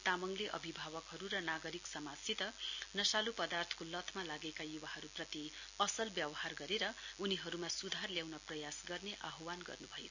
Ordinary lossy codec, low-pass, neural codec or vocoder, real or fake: none; 7.2 kHz; none; real